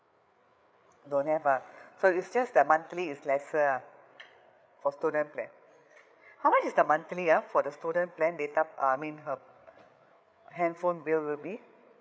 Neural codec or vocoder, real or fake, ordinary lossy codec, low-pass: codec, 16 kHz, 8 kbps, FreqCodec, larger model; fake; none; none